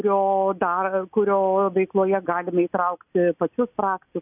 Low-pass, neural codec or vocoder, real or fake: 3.6 kHz; none; real